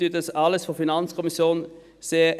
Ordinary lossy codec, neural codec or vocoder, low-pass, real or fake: none; none; 14.4 kHz; real